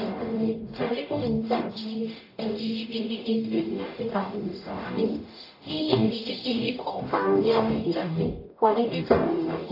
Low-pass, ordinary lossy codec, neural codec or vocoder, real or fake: 5.4 kHz; AAC, 32 kbps; codec, 44.1 kHz, 0.9 kbps, DAC; fake